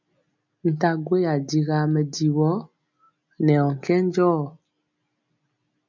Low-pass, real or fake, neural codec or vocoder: 7.2 kHz; real; none